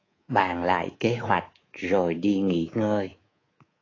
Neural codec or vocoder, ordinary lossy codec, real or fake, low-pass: codec, 24 kHz, 3.1 kbps, DualCodec; AAC, 32 kbps; fake; 7.2 kHz